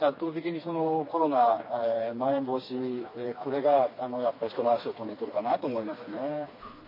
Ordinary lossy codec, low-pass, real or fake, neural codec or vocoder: MP3, 24 kbps; 5.4 kHz; fake; codec, 16 kHz, 2 kbps, FreqCodec, smaller model